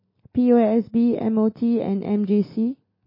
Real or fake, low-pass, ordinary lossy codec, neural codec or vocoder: real; 5.4 kHz; MP3, 24 kbps; none